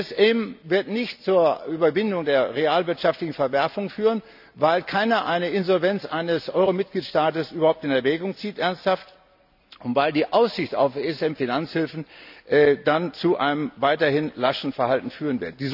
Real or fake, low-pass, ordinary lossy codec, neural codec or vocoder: real; 5.4 kHz; none; none